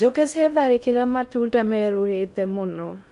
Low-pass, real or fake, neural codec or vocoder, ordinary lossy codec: 10.8 kHz; fake; codec, 16 kHz in and 24 kHz out, 0.6 kbps, FocalCodec, streaming, 4096 codes; none